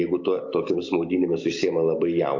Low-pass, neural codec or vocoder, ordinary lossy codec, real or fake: 7.2 kHz; none; MP3, 48 kbps; real